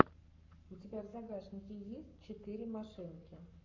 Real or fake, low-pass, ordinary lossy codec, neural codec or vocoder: fake; 7.2 kHz; MP3, 64 kbps; codec, 44.1 kHz, 7.8 kbps, Pupu-Codec